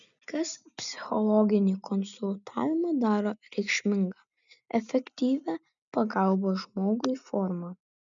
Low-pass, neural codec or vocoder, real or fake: 7.2 kHz; none; real